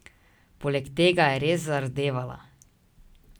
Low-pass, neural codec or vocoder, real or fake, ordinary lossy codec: none; none; real; none